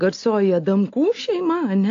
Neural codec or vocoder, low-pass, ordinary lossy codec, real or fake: none; 7.2 kHz; AAC, 48 kbps; real